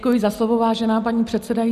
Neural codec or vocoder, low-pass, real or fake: none; 14.4 kHz; real